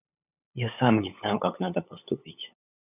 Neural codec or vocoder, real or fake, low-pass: codec, 16 kHz, 8 kbps, FunCodec, trained on LibriTTS, 25 frames a second; fake; 3.6 kHz